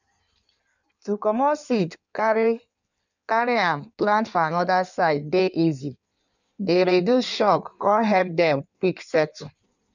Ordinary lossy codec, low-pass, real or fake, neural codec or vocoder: none; 7.2 kHz; fake; codec, 16 kHz in and 24 kHz out, 1.1 kbps, FireRedTTS-2 codec